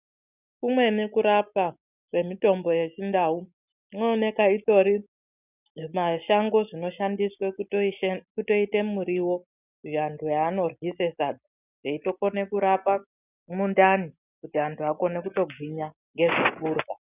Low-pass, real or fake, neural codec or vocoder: 3.6 kHz; real; none